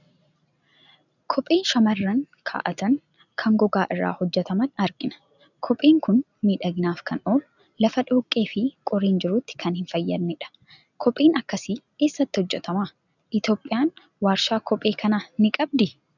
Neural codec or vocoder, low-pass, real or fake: none; 7.2 kHz; real